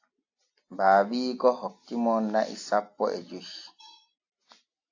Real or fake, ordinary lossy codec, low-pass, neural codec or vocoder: real; AAC, 48 kbps; 7.2 kHz; none